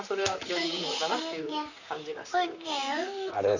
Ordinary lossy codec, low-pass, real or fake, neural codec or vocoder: none; 7.2 kHz; fake; vocoder, 44.1 kHz, 128 mel bands, Pupu-Vocoder